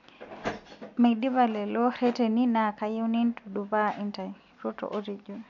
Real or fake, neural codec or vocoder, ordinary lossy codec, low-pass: real; none; none; 7.2 kHz